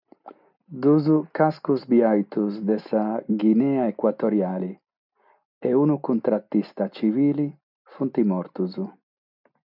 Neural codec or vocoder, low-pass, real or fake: none; 5.4 kHz; real